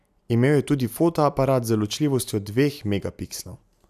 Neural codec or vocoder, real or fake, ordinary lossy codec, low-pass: none; real; none; 14.4 kHz